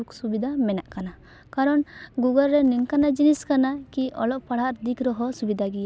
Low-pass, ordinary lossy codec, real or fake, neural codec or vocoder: none; none; real; none